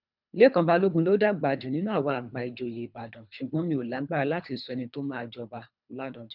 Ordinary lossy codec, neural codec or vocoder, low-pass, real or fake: none; codec, 24 kHz, 3 kbps, HILCodec; 5.4 kHz; fake